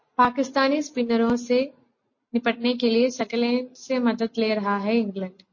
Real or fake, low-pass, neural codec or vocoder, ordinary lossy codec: real; 7.2 kHz; none; MP3, 32 kbps